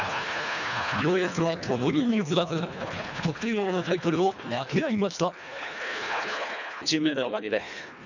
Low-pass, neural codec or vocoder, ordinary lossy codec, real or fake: 7.2 kHz; codec, 24 kHz, 1.5 kbps, HILCodec; none; fake